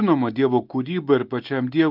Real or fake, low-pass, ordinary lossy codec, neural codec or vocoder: real; 5.4 kHz; Opus, 24 kbps; none